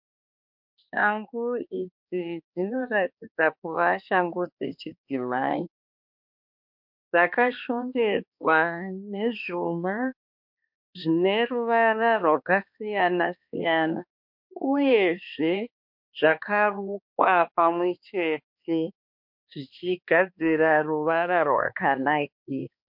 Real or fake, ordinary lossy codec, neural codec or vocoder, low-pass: fake; MP3, 48 kbps; codec, 16 kHz, 2 kbps, X-Codec, HuBERT features, trained on balanced general audio; 5.4 kHz